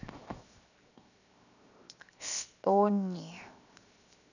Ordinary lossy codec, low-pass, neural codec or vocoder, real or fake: none; 7.2 kHz; codec, 16 kHz, 0.7 kbps, FocalCodec; fake